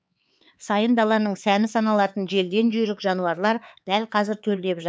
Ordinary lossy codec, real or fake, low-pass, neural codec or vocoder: none; fake; none; codec, 16 kHz, 4 kbps, X-Codec, HuBERT features, trained on LibriSpeech